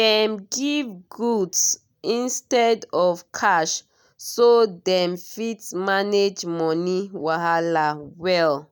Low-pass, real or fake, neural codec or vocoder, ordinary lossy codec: none; real; none; none